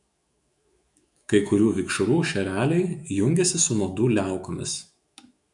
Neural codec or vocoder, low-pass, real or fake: autoencoder, 48 kHz, 128 numbers a frame, DAC-VAE, trained on Japanese speech; 10.8 kHz; fake